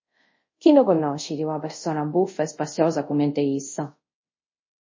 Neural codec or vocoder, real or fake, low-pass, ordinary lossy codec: codec, 24 kHz, 0.5 kbps, DualCodec; fake; 7.2 kHz; MP3, 32 kbps